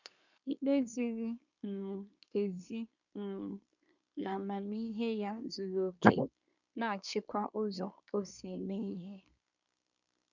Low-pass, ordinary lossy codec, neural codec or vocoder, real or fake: 7.2 kHz; none; codec, 24 kHz, 1 kbps, SNAC; fake